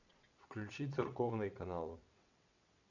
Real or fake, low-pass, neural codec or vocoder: fake; 7.2 kHz; vocoder, 22.05 kHz, 80 mel bands, WaveNeXt